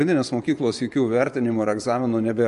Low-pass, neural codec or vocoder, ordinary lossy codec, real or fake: 10.8 kHz; vocoder, 24 kHz, 100 mel bands, Vocos; MP3, 64 kbps; fake